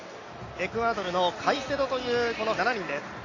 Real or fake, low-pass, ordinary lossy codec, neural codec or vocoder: real; 7.2 kHz; AAC, 32 kbps; none